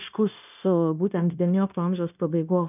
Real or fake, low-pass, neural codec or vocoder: fake; 3.6 kHz; codec, 16 kHz, 0.9 kbps, LongCat-Audio-Codec